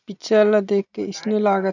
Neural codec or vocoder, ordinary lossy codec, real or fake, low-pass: none; none; real; 7.2 kHz